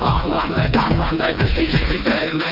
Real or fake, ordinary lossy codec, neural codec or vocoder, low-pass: fake; none; codec, 16 kHz, 2 kbps, FreqCodec, smaller model; 5.4 kHz